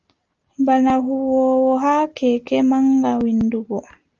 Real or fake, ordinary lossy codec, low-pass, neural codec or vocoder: real; Opus, 24 kbps; 7.2 kHz; none